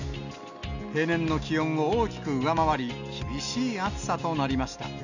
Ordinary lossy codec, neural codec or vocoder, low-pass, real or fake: none; none; 7.2 kHz; real